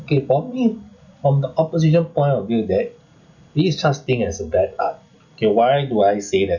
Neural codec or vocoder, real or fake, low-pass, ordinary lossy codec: none; real; 7.2 kHz; none